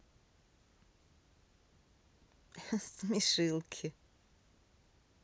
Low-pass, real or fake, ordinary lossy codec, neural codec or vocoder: none; real; none; none